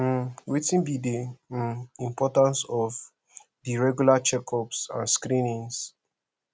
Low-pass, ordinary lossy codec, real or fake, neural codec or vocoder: none; none; real; none